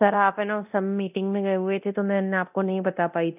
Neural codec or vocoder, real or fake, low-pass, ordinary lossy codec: codec, 24 kHz, 0.9 kbps, DualCodec; fake; 3.6 kHz; none